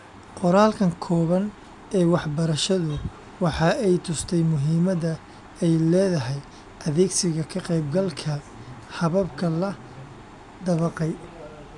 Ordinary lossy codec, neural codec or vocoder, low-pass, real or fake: none; none; 10.8 kHz; real